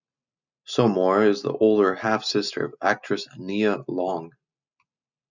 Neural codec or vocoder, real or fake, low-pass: none; real; 7.2 kHz